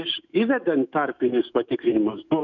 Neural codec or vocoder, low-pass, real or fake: autoencoder, 48 kHz, 128 numbers a frame, DAC-VAE, trained on Japanese speech; 7.2 kHz; fake